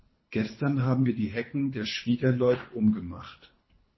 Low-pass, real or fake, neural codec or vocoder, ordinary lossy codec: 7.2 kHz; fake; codec, 24 kHz, 3 kbps, HILCodec; MP3, 24 kbps